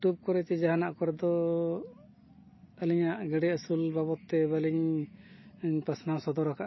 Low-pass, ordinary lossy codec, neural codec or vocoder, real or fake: 7.2 kHz; MP3, 24 kbps; none; real